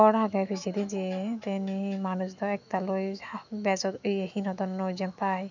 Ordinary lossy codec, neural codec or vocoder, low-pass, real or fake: none; autoencoder, 48 kHz, 128 numbers a frame, DAC-VAE, trained on Japanese speech; 7.2 kHz; fake